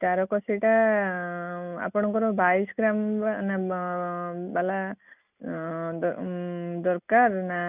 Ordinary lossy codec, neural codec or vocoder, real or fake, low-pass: none; none; real; 3.6 kHz